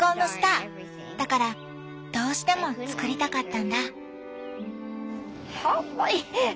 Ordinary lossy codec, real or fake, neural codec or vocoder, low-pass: none; real; none; none